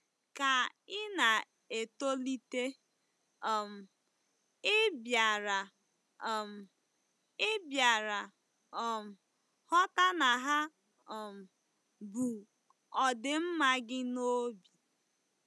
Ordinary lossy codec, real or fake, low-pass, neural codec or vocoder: none; real; none; none